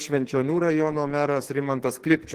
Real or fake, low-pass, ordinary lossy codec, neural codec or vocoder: fake; 14.4 kHz; Opus, 16 kbps; codec, 44.1 kHz, 2.6 kbps, SNAC